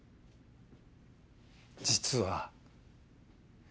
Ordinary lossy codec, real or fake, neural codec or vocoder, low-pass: none; real; none; none